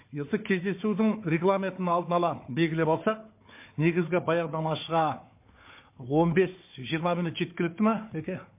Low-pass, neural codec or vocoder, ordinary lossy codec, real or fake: 3.6 kHz; codec, 16 kHz, 4 kbps, FunCodec, trained on Chinese and English, 50 frames a second; MP3, 24 kbps; fake